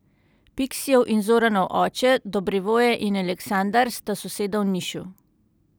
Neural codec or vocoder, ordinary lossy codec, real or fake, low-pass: none; none; real; none